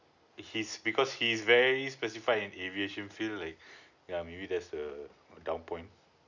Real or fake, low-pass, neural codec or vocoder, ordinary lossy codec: real; 7.2 kHz; none; none